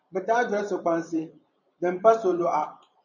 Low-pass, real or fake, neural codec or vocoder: 7.2 kHz; fake; vocoder, 44.1 kHz, 128 mel bands every 512 samples, BigVGAN v2